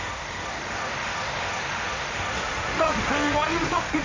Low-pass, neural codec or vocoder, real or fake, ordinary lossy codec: 7.2 kHz; codec, 16 kHz, 1.1 kbps, Voila-Tokenizer; fake; AAC, 32 kbps